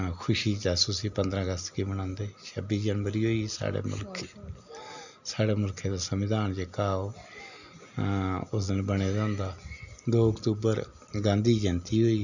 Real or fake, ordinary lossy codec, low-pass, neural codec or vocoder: real; none; 7.2 kHz; none